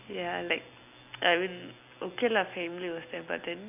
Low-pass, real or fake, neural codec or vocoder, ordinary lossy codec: 3.6 kHz; real; none; none